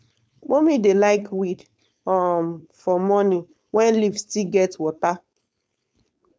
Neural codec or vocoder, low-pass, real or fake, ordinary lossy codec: codec, 16 kHz, 4.8 kbps, FACodec; none; fake; none